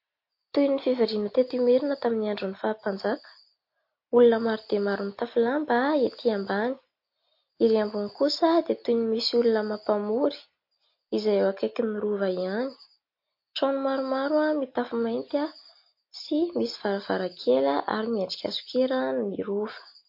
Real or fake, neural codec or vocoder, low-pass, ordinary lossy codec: real; none; 5.4 kHz; MP3, 32 kbps